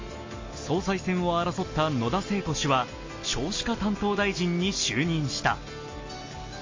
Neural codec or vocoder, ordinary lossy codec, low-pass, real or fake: none; MP3, 32 kbps; 7.2 kHz; real